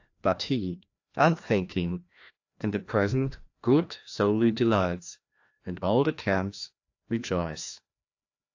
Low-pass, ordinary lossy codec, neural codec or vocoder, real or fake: 7.2 kHz; AAC, 48 kbps; codec, 16 kHz, 1 kbps, FreqCodec, larger model; fake